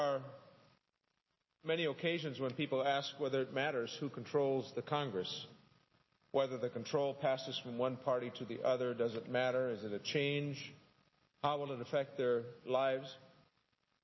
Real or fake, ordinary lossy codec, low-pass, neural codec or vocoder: real; MP3, 24 kbps; 7.2 kHz; none